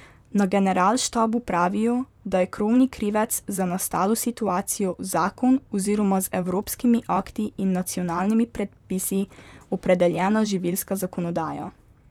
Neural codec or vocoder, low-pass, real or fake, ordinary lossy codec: vocoder, 44.1 kHz, 128 mel bands, Pupu-Vocoder; 19.8 kHz; fake; none